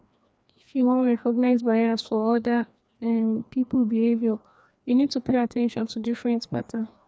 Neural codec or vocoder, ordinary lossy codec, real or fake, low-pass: codec, 16 kHz, 2 kbps, FreqCodec, larger model; none; fake; none